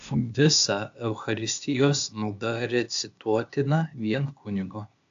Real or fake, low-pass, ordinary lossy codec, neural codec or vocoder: fake; 7.2 kHz; AAC, 64 kbps; codec, 16 kHz, 0.8 kbps, ZipCodec